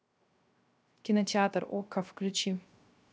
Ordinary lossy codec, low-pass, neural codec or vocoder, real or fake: none; none; codec, 16 kHz, 0.3 kbps, FocalCodec; fake